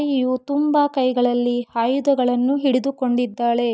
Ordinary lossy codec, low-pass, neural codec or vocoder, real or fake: none; none; none; real